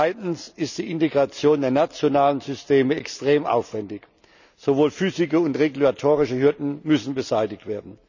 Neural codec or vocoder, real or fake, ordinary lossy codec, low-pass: none; real; none; 7.2 kHz